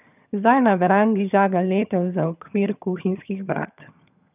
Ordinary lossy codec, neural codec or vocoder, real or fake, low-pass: none; vocoder, 22.05 kHz, 80 mel bands, HiFi-GAN; fake; 3.6 kHz